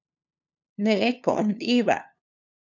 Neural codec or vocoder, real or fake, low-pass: codec, 16 kHz, 2 kbps, FunCodec, trained on LibriTTS, 25 frames a second; fake; 7.2 kHz